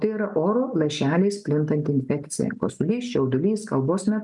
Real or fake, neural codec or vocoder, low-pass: real; none; 10.8 kHz